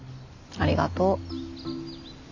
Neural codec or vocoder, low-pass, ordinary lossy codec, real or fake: none; 7.2 kHz; none; real